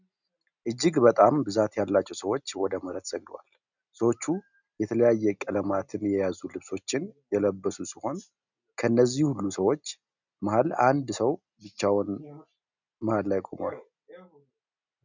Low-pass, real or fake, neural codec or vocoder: 7.2 kHz; real; none